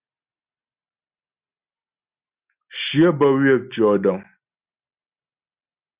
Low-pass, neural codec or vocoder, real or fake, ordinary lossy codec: 3.6 kHz; none; real; Opus, 64 kbps